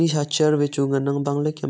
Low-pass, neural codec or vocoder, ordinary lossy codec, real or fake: none; none; none; real